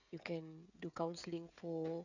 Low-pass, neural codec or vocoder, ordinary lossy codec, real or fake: 7.2 kHz; codec, 16 kHz, 16 kbps, FreqCodec, smaller model; none; fake